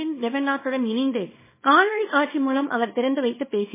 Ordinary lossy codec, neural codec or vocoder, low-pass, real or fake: MP3, 16 kbps; codec, 24 kHz, 0.9 kbps, WavTokenizer, small release; 3.6 kHz; fake